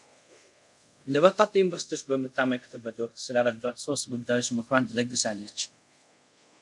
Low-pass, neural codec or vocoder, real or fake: 10.8 kHz; codec, 24 kHz, 0.5 kbps, DualCodec; fake